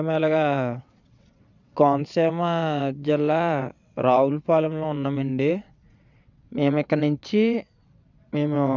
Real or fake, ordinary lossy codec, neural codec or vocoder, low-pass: fake; none; vocoder, 22.05 kHz, 80 mel bands, WaveNeXt; 7.2 kHz